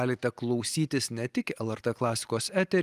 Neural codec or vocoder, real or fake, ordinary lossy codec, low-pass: none; real; Opus, 32 kbps; 14.4 kHz